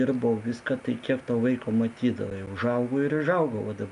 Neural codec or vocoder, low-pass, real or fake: none; 10.8 kHz; real